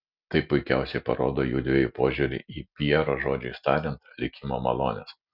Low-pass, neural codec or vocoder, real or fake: 5.4 kHz; none; real